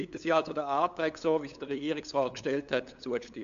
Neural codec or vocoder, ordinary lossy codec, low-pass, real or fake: codec, 16 kHz, 8 kbps, FunCodec, trained on LibriTTS, 25 frames a second; AAC, 64 kbps; 7.2 kHz; fake